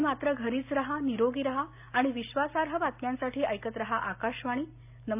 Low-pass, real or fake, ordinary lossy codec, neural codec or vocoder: 3.6 kHz; real; AAC, 32 kbps; none